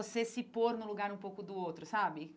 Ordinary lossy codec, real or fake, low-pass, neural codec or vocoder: none; real; none; none